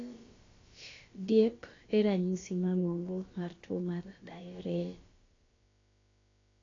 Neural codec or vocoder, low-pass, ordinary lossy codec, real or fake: codec, 16 kHz, about 1 kbps, DyCAST, with the encoder's durations; 7.2 kHz; AAC, 32 kbps; fake